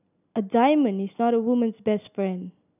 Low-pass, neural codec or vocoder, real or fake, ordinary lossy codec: 3.6 kHz; none; real; none